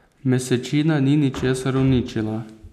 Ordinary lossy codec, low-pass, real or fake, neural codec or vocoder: none; 14.4 kHz; real; none